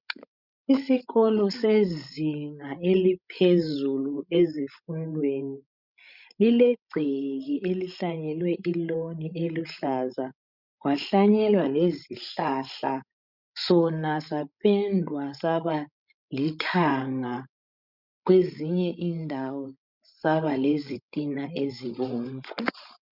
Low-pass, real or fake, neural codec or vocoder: 5.4 kHz; fake; codec, 16 kHz, 16 kbps, FreqCodec, larger model